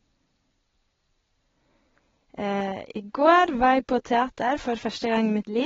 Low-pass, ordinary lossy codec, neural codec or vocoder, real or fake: 7.2 kHz; AAC, 24 kbps; none; real